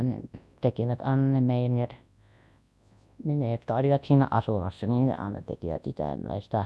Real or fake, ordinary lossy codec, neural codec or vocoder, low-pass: fake; none; codec, 24 kHz, 0.9 kbps, WavTokenizer, large speech release; none